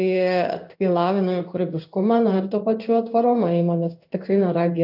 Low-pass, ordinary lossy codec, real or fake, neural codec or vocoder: 5.4 kHz; AAC, 48 kbps; fake; codec, 16 kHz in and 24 kHz out, 1 kbps, XY-Tokenizer